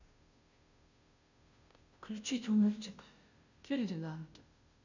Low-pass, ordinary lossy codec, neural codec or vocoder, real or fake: 7.2 kHz; none; codec, 16 kHz, 0.5 kbps, FunCodec, trained on Chinese and English, 25 frames a second; fake